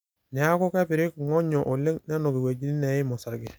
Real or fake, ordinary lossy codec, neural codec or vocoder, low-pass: real; none; none; none